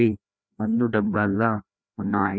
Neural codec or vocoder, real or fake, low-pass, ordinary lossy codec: codec, 16 kHz, 1 kbps, FreqCodec, larger model; fake; none; none